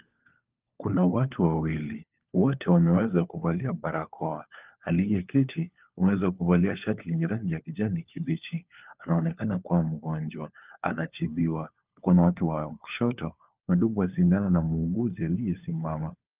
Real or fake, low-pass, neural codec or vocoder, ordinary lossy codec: fake; 3.6 kHz; codec, 16 kHz, 4 kbps, FunCodec, trained on LibriTTS, 50 frames a second; Opus, 24 kbps